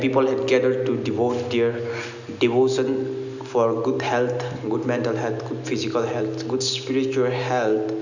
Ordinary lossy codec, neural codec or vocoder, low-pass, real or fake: none; none; 7.2 kHz; real